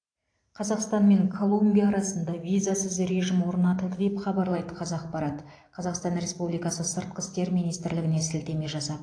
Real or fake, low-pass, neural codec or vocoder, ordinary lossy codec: fake; 9.9 kHz; vocoder, 44.1 kHz, 128 mel bands every 512 samples, BigVGAN v2; AAC, 48 kbps